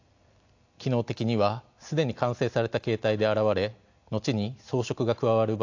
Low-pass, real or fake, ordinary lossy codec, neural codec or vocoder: 7.2 kHz; real; AAC, 48 kbps; none